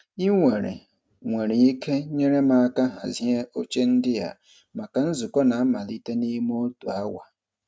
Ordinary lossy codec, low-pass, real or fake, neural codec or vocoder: none; none; real; none